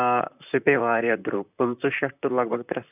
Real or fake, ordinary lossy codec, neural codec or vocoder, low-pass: fake; none; vocoder, 44.1 kHz, 128 mel bands, Pupu-Vocoder; 3.6 kHz